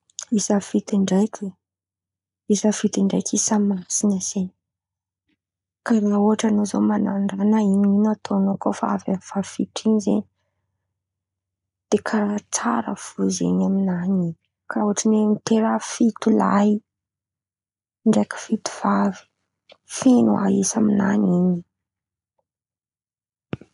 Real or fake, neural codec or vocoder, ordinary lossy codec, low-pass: real; none; none; 10.8 kHz